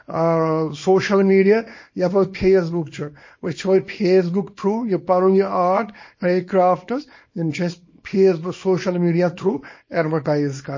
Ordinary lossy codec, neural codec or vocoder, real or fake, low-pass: MP3, 32 kbps; codec, 24 kHz, 0.9 kbps, WavTokenizer, small release; fake; 7.2 kHz